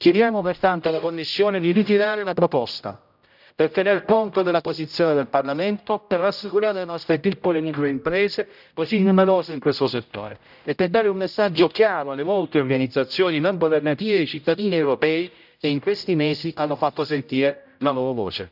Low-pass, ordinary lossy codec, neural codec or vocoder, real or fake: 5.4 kHz; none; codec, 16 kHz, 0.5 kbps, X-Codec, HuBERT features, trained on general audio; fake